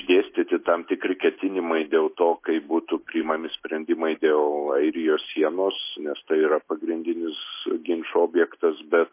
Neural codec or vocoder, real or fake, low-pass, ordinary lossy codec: none; real; 3.6 kHz; MP3, 24 kbps